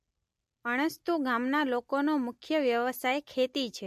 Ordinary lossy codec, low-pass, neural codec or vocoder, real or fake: MP3, 64 kbps; 14.4 kHz; none; real